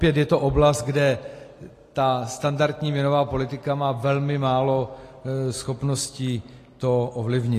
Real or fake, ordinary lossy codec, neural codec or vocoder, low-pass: real; AAC, 48 kbps; none; 14.4 kHz